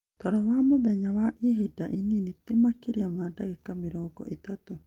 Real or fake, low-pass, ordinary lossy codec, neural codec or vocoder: real; 19.8 kHz; Opus, 32 kbps; none